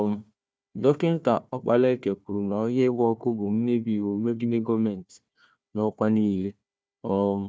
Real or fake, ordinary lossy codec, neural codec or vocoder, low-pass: fake; none; codec, 16 kHz, 1 kbps, FunCodec, trained on Chinese and English, 50 frames a second; none